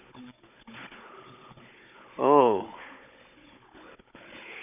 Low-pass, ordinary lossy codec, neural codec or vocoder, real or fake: 3.6 kHz; none; codec, 16 kHz, 16 kbps, FunCodec, trained on Chinese and English, 50 frames a second; fake